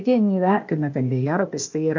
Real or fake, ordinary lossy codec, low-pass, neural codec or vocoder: fake; AAC, 48 kbps; 7.2 kHz; codec, 16 kHz, 0.8 kbps, ZipCodec